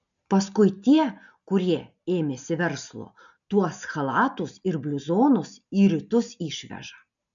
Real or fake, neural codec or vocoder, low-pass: real; none; 7.2 kHz